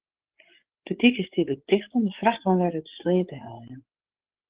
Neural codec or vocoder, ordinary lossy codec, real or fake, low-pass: codec, 16 kHz, 8 kbps, FreqCodec, larger model; Opus, 16 kbps; fake; 3.6 kHz